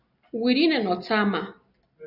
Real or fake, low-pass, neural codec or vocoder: real; 5.4 kHz; none